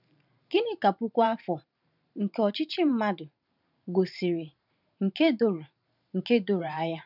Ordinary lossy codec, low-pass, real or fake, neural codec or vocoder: none; 5.4 kHz; fake; vocoder, 44.1 kHz, 128 mel bands every 512 samples, BigVGAN v2